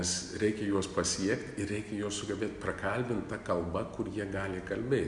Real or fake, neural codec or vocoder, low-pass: real; none; 10.8 kHz